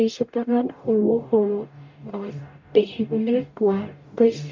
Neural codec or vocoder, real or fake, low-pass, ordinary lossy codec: codec, 44.1 kHz, 0.9 kbps, DAC; fake; 7.2 kHz; MP3, 48 kbps